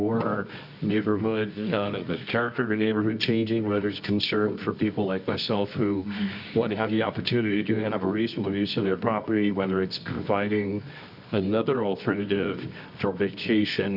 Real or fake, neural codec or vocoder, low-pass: fake; codec, 24 kHz, 0.9 kbps, WavTokenizer, medium music audio release; 5.4 kHz